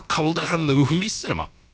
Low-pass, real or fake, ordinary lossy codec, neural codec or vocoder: none; fake; none; codec, 16 kHz, about 1 kbps, DyCAST, with the encoder's durations